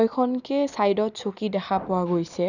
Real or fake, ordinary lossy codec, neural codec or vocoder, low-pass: real; none; none; 7.2 kHz